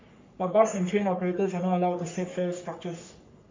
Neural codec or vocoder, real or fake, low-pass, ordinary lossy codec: codec, 44.1 kHz, 3.4 kbps, Pupu-Codec; fake; 7.2 kHz; MP3, 48 kbps